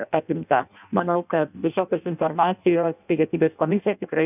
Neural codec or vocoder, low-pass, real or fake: codec, 16 kHz in and 24 kHz out, 0.6 kbps, FireRedTTS-2 codec; 3.6 kHz; fake